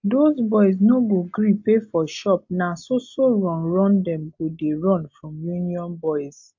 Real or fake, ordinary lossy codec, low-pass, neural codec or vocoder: real; MP3, 48 kbps; 7.2 kHz; none